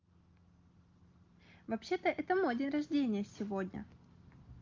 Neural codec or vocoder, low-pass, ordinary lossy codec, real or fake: none; 7.2 kHz; Opus, 32 kbps; real